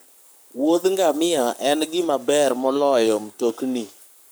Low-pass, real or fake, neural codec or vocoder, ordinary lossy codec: none; fake; codec, 44.1 kHz, 7.8 kbps, Pupu-Codec; none